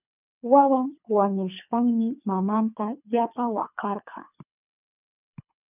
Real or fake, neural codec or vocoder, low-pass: fake; codec, 24 kHz, 3 kbps, HILCodec; 3.6 kHz